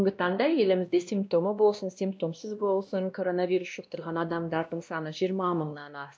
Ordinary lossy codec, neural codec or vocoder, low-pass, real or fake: none; codec, 16 kHz, 1 kbps, X-Codec, WavLM features, trained on Multilingual LibriSpeech; none; fake